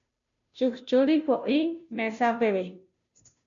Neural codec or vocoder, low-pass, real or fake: codec, 16 kHz, 0.5 kbps, FunCodec, trained on Chinese and English, 25 frames a second; 7.2 kHz; fake